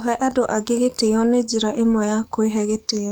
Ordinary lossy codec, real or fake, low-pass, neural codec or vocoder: none; fake; none; codec, 44.1 kHz, 7.8 kbps, DAC